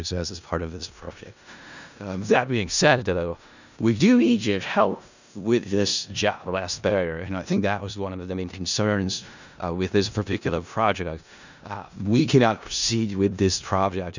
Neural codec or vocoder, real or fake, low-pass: codec, 16 kHz in and 24 kHz out, 0.4 kbps, LongCat-Audio-Codec, four codebook decoder; fake; 7.2 kHz